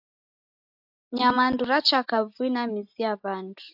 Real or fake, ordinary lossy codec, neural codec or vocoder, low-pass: real; AAC, 48 kbps; none; 5.4 kHz